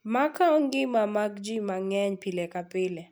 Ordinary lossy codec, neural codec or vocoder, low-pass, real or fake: none; none; none; real